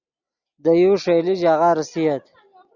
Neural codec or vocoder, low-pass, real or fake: none; 7.2 kHz; real